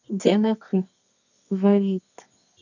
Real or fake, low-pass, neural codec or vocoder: fake; 7.2 kHz; codec, 24 kHz, 0.9 kbps, WavTokenizer, medium music audio release